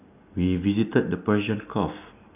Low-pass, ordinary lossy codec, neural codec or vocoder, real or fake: 3.6 kHz; none; none; real